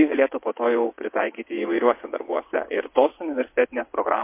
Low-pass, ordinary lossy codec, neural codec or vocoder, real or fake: 3.6 kHz; MP3, 24 kbps; vocoder, 22.05 kHz, 80 mel bands, WaveNeXt; fake